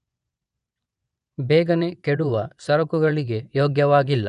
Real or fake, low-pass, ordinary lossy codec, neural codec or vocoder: fake; 10.8 kHz; none; vocoder, 24 kHz, 100 mel bands, Vocos